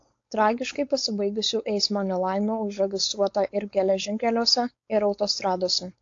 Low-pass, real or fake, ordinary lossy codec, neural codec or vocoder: 7.2 kHz; fake; AAC, 48 kbps; codec, 16 kHz, 4.8 kbps, FACodec